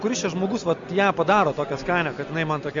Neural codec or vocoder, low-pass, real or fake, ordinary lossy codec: none; 7.2 kHz; real; Opus, 64 kbps